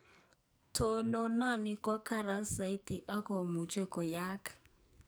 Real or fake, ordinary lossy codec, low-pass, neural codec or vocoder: fake; none; none; codec, 44.1 kHz, 2.6 kbps, SNAC